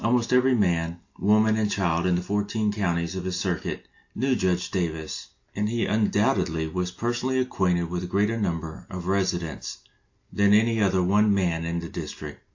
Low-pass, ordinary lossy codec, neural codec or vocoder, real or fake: 7.2 kHz; AAC, 48 kbps; none; real